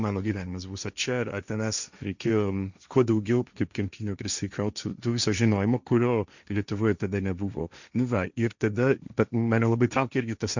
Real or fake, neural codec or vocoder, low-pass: fake; codec, 16 kHz, 1.1 kbps, Voila-Tokenizer; 7.2 kHz